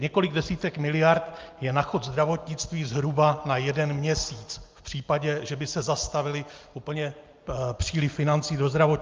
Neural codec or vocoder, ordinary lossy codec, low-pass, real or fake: none; Opus, 24 kbps; 7.2 kHz; real